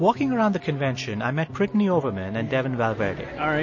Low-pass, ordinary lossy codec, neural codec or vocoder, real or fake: 7.2 kHz; MP3, 32 kbps; none; real